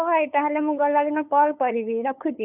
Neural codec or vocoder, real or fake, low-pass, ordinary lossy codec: codec, 16 kHz, 8 kbps, FunCodec, trained on LibriTTS, 25 frames a second; fake; 3.6 kHz; none